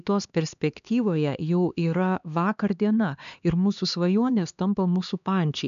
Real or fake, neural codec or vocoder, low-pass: fake; codec, 16 kHz, 2 kbps, X-Codec, HuBERT features, trained on LibriSpeech; 7.2 kHz